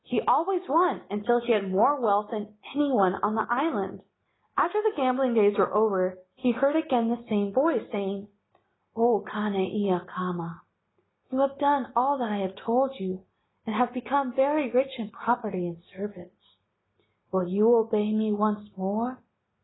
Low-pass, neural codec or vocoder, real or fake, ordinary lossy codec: 7.2 kHz; none; real; AAC, 16 kbps